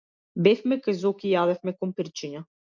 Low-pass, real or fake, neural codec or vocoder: 7.2 kHz; real; none